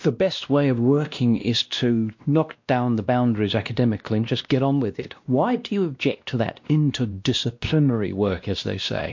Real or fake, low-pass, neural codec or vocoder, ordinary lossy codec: fake; 7.2 kHz; codec, 16 kHz, 1 kbps, X-Codec, WavLM features, trained on Multilingual LibriSpeech; MP3, 48 kbps